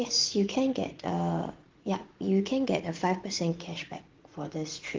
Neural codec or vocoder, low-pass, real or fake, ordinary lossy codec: vocoder, 44.1 kHz, 80 mel bands, Vocos; 7.2 kHz; fake; Opus, 16 kbps